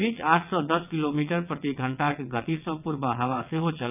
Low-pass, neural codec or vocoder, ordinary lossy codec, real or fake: 3.6 kHz; vocoder, 22.05 kHz, 80 mel bands, WaveNeXt; none; fake